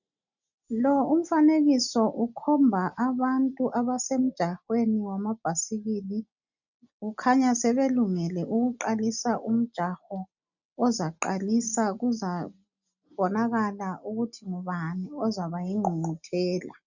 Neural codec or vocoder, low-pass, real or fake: none; 7.2 kHz; real